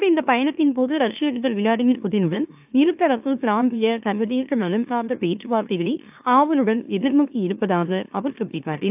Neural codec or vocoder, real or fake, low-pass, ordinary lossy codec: autoencoder, 44.1 kHz, a latent of 192 numbers a frame, MeloTTS; fake; 3.6 kHz; AAC, 32 kbps